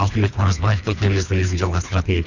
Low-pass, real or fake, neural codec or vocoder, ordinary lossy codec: 7.2 kHz; fake; codec, 24 kHz, 3 kbps, HILCodec; none